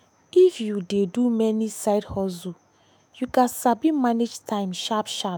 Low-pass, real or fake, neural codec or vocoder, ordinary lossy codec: none; fake; autoencoder, 48 kHz, 128 numbers a frame, DAC-VAE, trained on Japanese speech; none